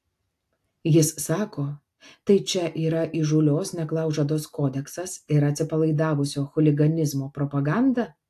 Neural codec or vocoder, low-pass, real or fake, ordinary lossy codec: none; 14.4 kHz; real; AAC, 64 kbps